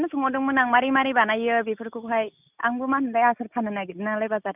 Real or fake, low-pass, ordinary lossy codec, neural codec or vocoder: real; 3.6 kHz; none; none